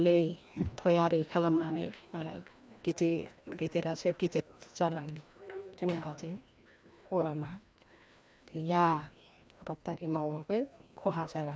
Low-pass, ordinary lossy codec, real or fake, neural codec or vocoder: none; none; fake; codec, 16 kHz, 1 kbps, FreqCodec, larger model